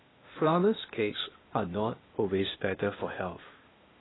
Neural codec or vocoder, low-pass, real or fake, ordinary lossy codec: codec, 16 kHz, 0.8 kbps, ZipCodec; 7.2 kHz; fake; AAC, 16 kbps